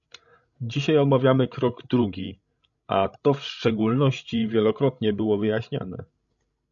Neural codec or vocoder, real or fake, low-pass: codec, 16 kHz, 16 kbps, FreqCodec, larger model; fake; 7.2 kHz